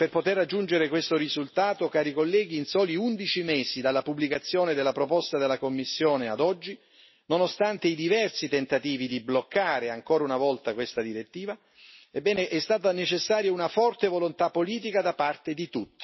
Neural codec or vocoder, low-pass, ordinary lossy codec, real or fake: none; 7.2 kHz; MP3, 24 kbps; real